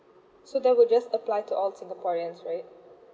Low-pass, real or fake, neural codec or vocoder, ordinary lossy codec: none; real; none; none